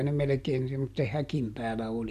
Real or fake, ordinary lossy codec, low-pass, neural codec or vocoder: real; MP3, 96 kbps; 14.4 kHz; none